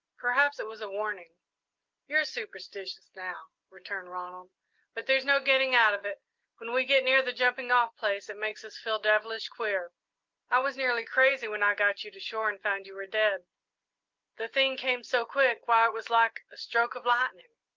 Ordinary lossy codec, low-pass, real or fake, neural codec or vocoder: Opus, 32 kbps; 7.2 kHz; real; none